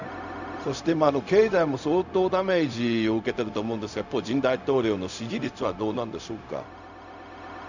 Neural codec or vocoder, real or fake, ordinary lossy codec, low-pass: codec, 16 kHz, 0.4 kbps, LongCat-Audio-Codec; fake; none; 7.2 kHz